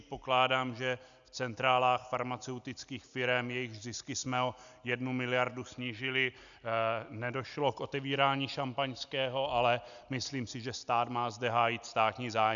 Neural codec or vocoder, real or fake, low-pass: none; real; 7.2 kHz